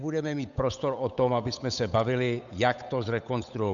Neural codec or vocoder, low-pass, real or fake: codec, 16 kHz, 8 kbps, FunCodec, trained on Chinese and English, 25 frames a second; 7.2 kHz; fake